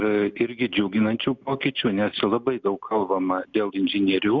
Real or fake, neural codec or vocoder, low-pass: real; none; 7.2 kHz